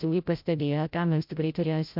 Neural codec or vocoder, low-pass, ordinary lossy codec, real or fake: codec, 16 kHz, 0.5 kbps, FreqCodec, larger model; 5.4 kHz; MP3, 48 kbps; fake